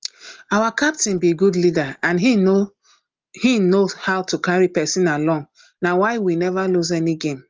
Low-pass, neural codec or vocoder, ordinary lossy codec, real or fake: 7.2 kHz; none; Opus, 24 kbps; real